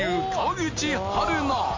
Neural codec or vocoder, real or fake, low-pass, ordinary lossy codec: none; real; 7.2 kHz; MP3, 48 kbps